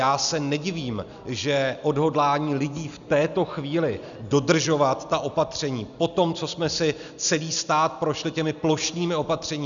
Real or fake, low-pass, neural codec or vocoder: real; 7.2 kHz; none